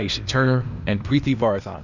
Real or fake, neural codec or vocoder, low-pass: fake; codec, 16 kHz, 0.8 kbps, ZipCodec; 7.2 kHz